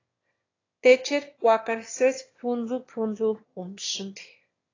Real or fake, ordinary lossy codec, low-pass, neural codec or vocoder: fake; AAC, 32 kbps; 7.2 kHz; autoencoder, 22.05 kHz, a latent of 192 numbers a frame, VITS, trained on one speaker